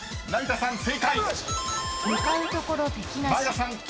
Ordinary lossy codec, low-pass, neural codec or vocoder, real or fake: none; none; none; real